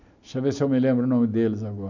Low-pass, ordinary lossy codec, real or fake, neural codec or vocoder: 7.2 kHz; none; real; none